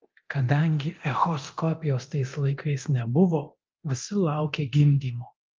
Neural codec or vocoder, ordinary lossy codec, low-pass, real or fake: codec, 24 kHz, 0.9 kbps, DualCodec; Opus, 32 kbps; 7.2 kHz; fake